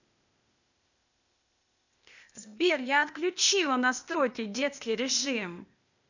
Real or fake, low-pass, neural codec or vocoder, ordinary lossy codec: fake; 7.2 kHz; codec, 16 kHz, 0.8 kbps, ZipCodec; none